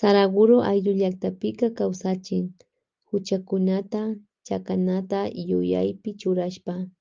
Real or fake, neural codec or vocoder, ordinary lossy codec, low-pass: real; none; Opus, 24 kbps; 7.2 kHz